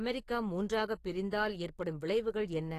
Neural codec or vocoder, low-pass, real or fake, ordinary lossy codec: codec, 44.1 kHz, 7.8 kbps, DAC; 14.4 kHz; fake; AAC, 48 kbps